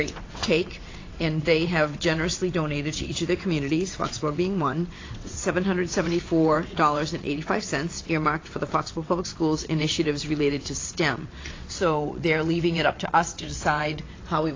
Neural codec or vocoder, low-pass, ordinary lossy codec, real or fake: vocoder, 22.05 kHz, 80 mel bands, WaveNeXt; 7.2 kHz; AAC, 32 kbps; fake